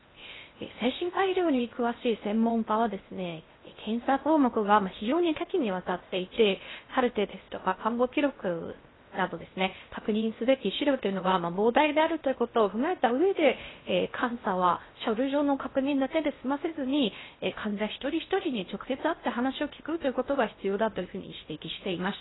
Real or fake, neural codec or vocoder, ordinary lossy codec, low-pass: fake; codec, 16 kHz in and 24 kHz out, 0.6 kbps, FocalCodec, streaming, 2048 codes; AAC, 16 kbps; 7.2 kHz